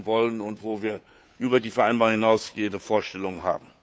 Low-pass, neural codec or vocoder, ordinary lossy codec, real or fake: none; codec, 16 kHz, 2 kbps, FunCodec, trained on Chinese and English, 25 frames a second; none; fake